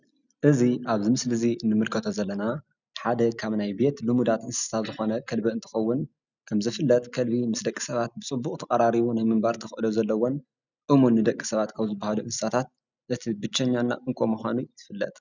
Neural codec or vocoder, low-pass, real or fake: none; 7.2 kHz; real